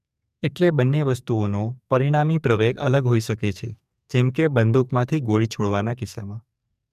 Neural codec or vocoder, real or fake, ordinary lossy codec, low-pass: codec, 44.1 kHz, 2.6 kbps, SNAC; fake; none; 14.4 kHz